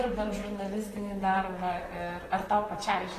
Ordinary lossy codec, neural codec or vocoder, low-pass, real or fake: AAC, 48 kbps; vocoder, 44.1 kHz, 128 mel bands, Pupu-Vocoder; 14.4 kHz; fake